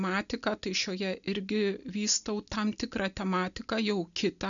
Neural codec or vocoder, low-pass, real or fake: none; 7.2 kHz; real